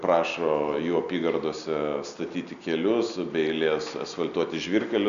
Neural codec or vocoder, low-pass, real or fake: none; 7.2 kHz; real